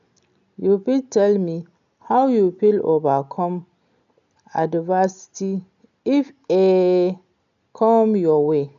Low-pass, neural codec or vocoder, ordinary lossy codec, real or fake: 7.2 kHz; none; none; real